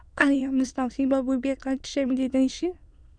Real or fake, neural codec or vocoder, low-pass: fake; autoencoder, 22.05 kHz, a latent of 192 numbers a frame, VITS, trained on many speakers; 9.9 kHz